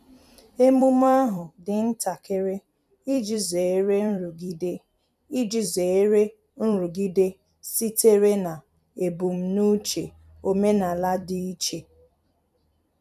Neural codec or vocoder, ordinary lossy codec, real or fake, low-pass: vocoder, 44.1 kHz, 128 mel bands every 256 samples, BigVGAN v2; none; fake; 14.4 kHz